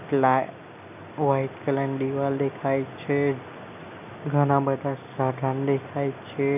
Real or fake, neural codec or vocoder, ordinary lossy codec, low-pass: real; none; none; 3.6 kHz